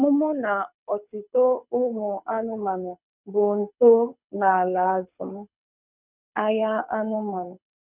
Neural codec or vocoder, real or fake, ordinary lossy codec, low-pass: codec, 24 kHz, 6 kbps, HILCodec; fake; none; 3.6 kHz